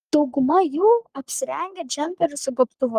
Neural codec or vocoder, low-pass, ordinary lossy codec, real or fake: codec, 44.1 kHz, 3.4 kbps, Pupu-Codec; 14.4 kHz; Opus, 32 kbps; fake